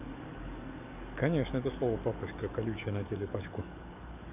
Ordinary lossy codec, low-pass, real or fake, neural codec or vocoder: none; 3.6 kHz; fake; codec, 44.1 kHz, 7.8 kbps, DAC